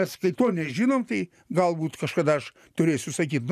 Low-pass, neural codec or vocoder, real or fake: 14.4 kHz; none; real